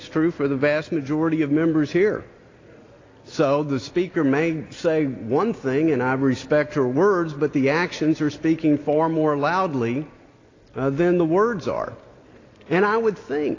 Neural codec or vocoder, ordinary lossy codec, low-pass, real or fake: none; AAC, 32 kbps; 7.2 kHz; real